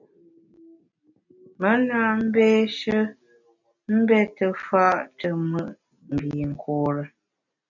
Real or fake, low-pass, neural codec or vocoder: real; 7.2 kHz; none